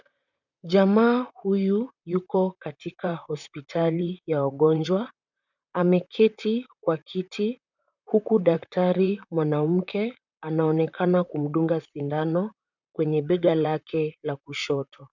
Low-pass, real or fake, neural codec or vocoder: 7.2 kHz; fake; vocoder, 44.1 kHz, 128 mel bands, Pupu-Vocoder